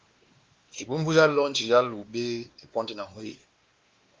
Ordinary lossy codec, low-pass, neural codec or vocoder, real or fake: Opus, 32 kbps; 7.2 kHz; codec, 16 kHz, 2 kbps, X-Codec, WavLM features, trained on Multilingual LibriSpeech; fake